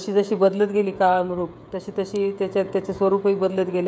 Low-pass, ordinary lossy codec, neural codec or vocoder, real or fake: none; none; codec, 16 kHz, 16 kbps, FreqCodec, smaller model; fake